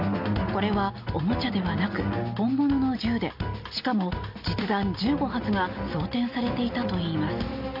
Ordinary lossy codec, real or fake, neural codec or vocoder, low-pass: AAC, 48 kbps; real; none; 5.4 kHz